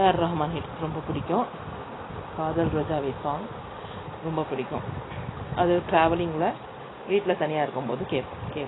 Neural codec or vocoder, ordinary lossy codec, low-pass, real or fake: none; AAC, 16 kbps; 7.2 kHz; real